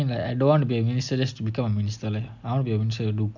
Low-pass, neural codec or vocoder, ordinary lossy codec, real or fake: 7.2 kHz; none; none; real